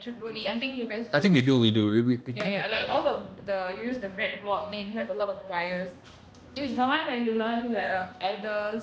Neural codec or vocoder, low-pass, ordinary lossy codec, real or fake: codec, 16 kHz, 1 kbps, X-Codec, HuBERT features, trained on balanced general audio; none; none; fake